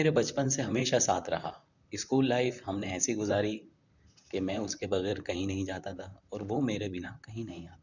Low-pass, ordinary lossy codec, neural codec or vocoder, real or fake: 7.2 kHz; none; vocoder, 44.1 kHz, 128 mel bands, Pupu-Vocoder; fake